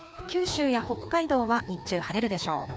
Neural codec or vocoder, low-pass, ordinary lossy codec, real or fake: codec, 16 kHz, 2 kbps, FreqCodec, larger model; none; none; fake